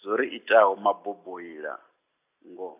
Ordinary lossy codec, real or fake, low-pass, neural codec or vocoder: none; real; 3.6 kHz; none